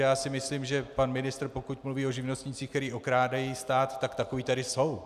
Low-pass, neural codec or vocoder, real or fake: 14.4 kHz; none; real